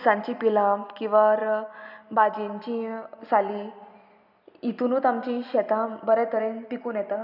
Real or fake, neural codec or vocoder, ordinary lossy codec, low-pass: real; none; none; 5.4 kHz